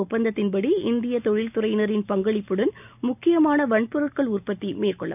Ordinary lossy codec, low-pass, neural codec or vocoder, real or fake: none; 3.6 kHz; none; real